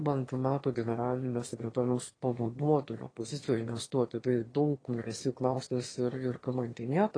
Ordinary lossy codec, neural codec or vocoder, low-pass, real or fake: AAC, 32 kbps; autoencoder, 22.05 kHz, a latent of 192 numbers a frame, VITS, trained on one speaker; 9.9 kHz; fake